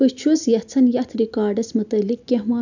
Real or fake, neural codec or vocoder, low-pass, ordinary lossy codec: real; none; 7.2 kHz; none